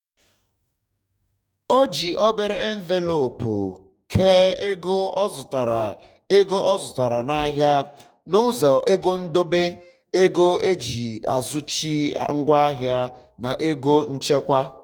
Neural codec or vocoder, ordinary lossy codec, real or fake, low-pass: codec, 44.1 kHz, 2.6 kbps, DAC; none; fake; 19.8 kHz